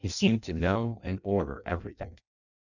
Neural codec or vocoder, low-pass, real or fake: codec, 16 kHz in and 24 kHz out, 0.6 kbps, FireRedTTS-2 codec; 7.2 kHz; fake